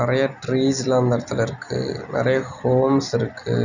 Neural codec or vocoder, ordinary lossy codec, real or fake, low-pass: none; none; real; 7.2 kHz